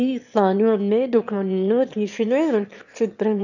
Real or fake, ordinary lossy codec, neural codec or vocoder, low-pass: fake; none; autoencoder, 22.05 kHz, a latent of 192 numbers a frame, VITS, trained on one speaker; 7.2 kHz